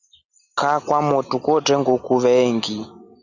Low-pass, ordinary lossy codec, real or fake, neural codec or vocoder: 7.2 kHz; Opus, 64 kbps; real; none